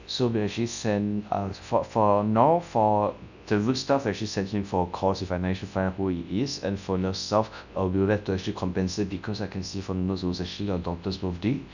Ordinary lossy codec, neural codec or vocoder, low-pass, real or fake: none; codec, 24 kHz, 0.9 kbps, WavTokenizer, large speech release; 7.2 kHz; fake